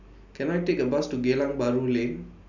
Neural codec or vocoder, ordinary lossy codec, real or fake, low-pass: none; none; real; 7.2 kHz